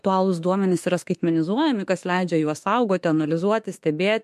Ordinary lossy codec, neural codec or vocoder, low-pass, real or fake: MP3, 64 kbps; autoencoder, 48 kHz, 32 numbers a frame, DAC-VAE, trained on Japanese speech; 14.4 kHz; fake